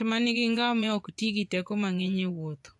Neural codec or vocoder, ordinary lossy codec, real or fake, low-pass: vocoder, 24 kHz, 100 mel bands, Vocos; AAC, 64 kbps; fake; 10.8 kHz